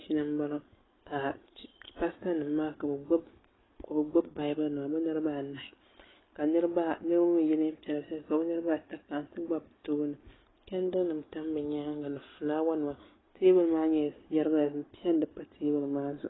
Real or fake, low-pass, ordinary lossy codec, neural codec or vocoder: real; 7.2 kHz; AAC, 16 kbps; none